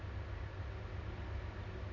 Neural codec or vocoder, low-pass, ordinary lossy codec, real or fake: none; 7.2 kHz; none; real